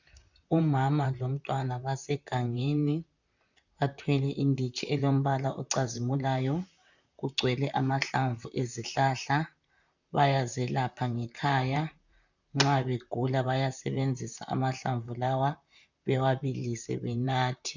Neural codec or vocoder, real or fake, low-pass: vocoder, 44.1 kHz, 128 mel bands, Pupu-Vocoder; fake; 7.2 kHz